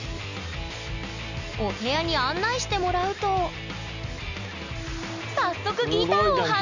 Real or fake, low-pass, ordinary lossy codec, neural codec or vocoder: real; 7.2 kHz; none; none